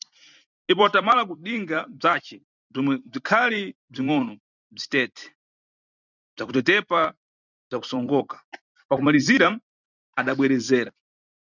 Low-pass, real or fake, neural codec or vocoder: 7.2 kHz; fake; vocoder, 44.1 kHz, 128 mel bands every 256 samples, BigVGAN v2